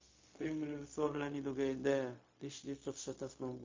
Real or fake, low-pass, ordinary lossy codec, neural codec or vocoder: fake; 7.2 kHz; MP3, 32 kbps; codec, 16 kHz, 0.4 kbps, LongCat-Audio-Codec